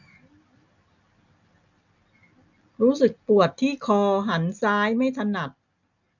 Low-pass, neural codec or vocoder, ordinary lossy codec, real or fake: 7.2 kHz; none; none; real